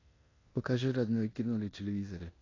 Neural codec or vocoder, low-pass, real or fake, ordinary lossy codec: codec, 16 kHz in and 24 kHz out, 0.9 kbps, LongCat-Audio-Codec, four codebook decoder; 7.2 kHz; fake; AAC, 32 kbps